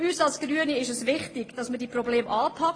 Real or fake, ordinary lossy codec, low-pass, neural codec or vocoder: real; AAC, 32 kbps; 9.9 kHz; none